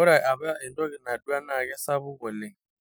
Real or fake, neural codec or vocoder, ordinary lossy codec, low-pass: real; none; none; none